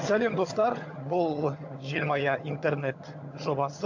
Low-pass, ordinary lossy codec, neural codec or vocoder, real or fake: 7.2 kHz; AAC, 48 kbps; vocoder, 22.05 kHz, 80 mel bands, HiFi-GAN; fake